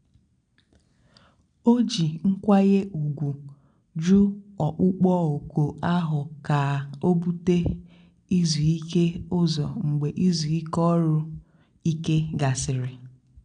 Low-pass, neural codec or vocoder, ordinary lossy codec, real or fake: 9.9 kHz; none; none; real